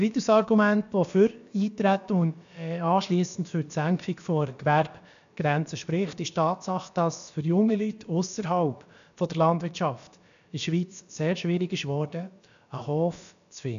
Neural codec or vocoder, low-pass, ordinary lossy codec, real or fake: codec, 16 kHz, about 1 kbps, DyCAST, with the encoder's durations; 7.2 kHz; MP3, 96 kbps; fake